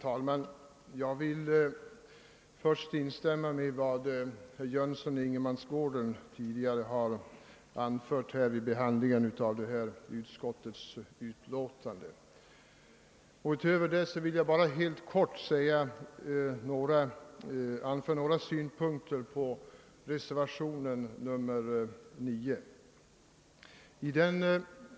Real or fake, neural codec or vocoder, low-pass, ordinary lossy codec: real; none; none; none